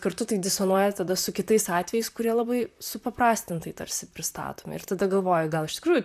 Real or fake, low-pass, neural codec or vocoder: fake; 14.4 kHz; vocoder, 44.1 kHz, 128 mel bands, Pupu-Vocoder